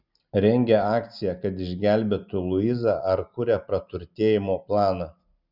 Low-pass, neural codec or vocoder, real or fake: 5.4 kHz; none; real